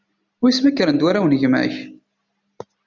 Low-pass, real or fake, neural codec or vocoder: 7.2 kHz; real; none